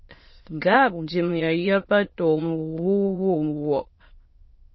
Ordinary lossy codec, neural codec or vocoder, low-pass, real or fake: MP3, 24 kbps; autoencoder, 22.05 kHz, a latent of 192 numbers a frame, VITS, trained on many speakers; 7.2 kHz; fake